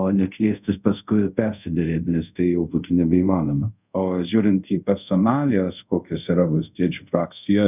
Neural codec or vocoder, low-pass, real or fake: codec, 24 kHz, 0.5 kbps, DualCodec; 3.6 kHz; fake